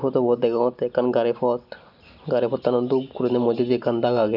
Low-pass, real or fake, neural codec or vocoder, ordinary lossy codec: 5.4 kHz; real; none; none